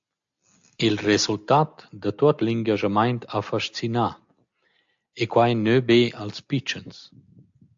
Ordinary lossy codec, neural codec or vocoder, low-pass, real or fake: AAC, 64 kbps; none; 7.2 kHz; real